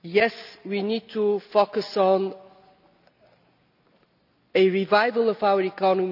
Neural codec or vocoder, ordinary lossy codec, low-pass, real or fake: none; none; 5.4 kHz; real